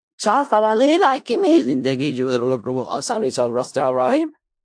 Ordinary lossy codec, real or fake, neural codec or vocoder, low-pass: AAC, 64 kbps; fake; codec, 16 kHz in and 24 kHz out, 0.4 kbps, LongCat-Audio-Codec, four codebook decoder; 9.9 kHz